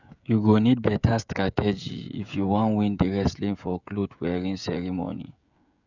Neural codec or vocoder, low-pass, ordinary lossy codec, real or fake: codec, 16 kHz, 16 kbps, FreqCodec, smaller model; 7.2 kHz; none; fake